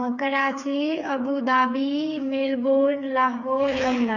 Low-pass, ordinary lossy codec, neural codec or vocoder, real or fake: 7.2 kHz; none; codec, 16 kHz, 4 kbps, FreqCodec, smaller model; fake